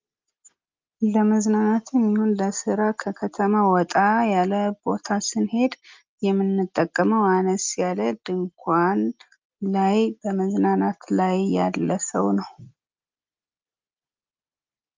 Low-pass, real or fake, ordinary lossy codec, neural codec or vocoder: 7.2 kHz; real; Opus, 24 kbps; none